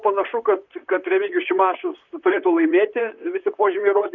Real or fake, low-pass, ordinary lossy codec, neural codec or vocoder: fake; 7.2 kHz; Opus, 64 kbps; vocoder, 44.1 kHz, 128 mel bands, Pupu-Vocoder